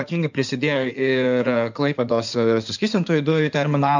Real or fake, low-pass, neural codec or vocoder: fake; 7.2 kHz; codec, 16 kHz in and 24 kHz out, 2.2 kbps, FireRedTTS-2 codec